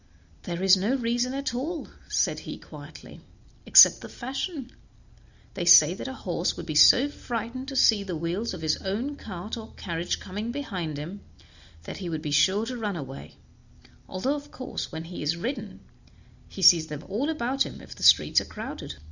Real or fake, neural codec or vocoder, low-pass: real; none; 7.2 kHz